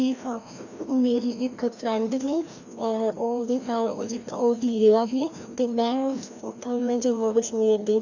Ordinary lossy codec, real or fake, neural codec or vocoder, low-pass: none; fake; codec, 16 kHz, 1 kbps, FreqCodec, larger model; 7.2 kHz